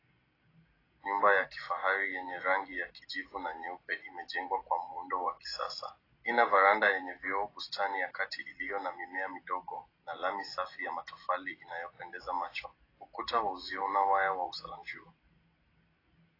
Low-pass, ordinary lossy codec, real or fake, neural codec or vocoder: 5.4 kHz; AAC, 24 kbps; real; none